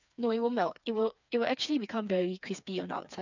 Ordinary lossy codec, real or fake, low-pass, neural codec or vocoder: none; fake; 7.2 kHz; codec, 16 kHz, 4 kbps, FreqCodec, smaller model